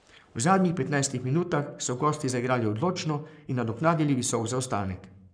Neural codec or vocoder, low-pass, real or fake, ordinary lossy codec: codec, 44.1 kHz, 7.8 kbps, Pupu-Codec; 9.9 kHz; fake; none